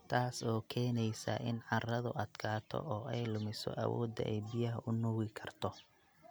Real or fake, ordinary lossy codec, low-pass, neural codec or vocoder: real; none; none; none